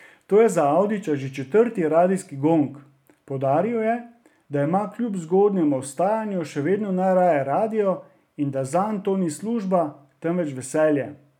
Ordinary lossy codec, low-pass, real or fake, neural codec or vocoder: none; 19.8 kHz; real; none